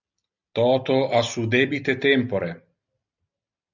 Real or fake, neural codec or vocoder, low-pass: real; none; 7.2 kHz